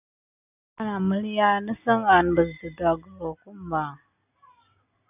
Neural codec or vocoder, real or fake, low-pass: none; real; 3.6 kHz